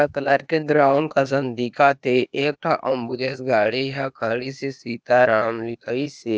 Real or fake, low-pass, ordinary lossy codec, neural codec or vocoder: fake; none; none; codec, 16 kHz, 0.8 kbps, ZipCodec